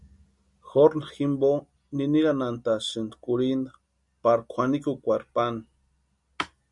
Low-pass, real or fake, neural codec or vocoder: 10.8 kHz; real; none